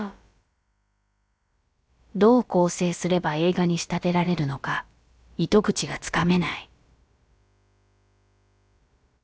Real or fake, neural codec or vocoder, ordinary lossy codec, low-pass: fake; codec, 16 kHz, about 1 kbps, DyCAST, with the encoder's durations; none; none